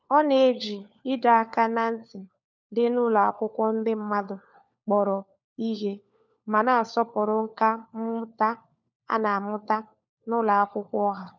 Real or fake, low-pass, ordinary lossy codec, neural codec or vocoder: fake; 7.2 kHz; none; codec, 16 kHz, 4 kbps, FunCodec, trained on LibriTTS, 50 frames a second